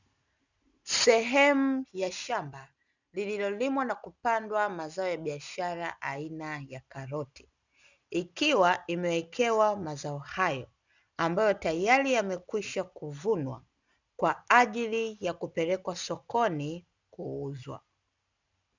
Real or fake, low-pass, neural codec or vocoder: real; 7.2 kHz; none